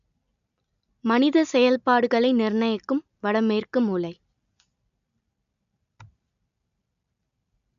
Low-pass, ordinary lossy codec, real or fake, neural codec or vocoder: 7.2 kHz; none; real; none